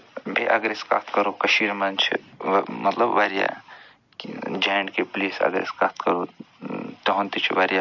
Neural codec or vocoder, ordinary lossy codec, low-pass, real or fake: none; none; 7.2 kHz; real